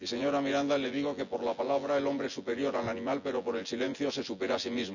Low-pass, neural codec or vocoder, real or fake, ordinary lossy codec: 7.2 kHz; vocoder, 24 kHz, 100 mel bands, Vocos; fake; none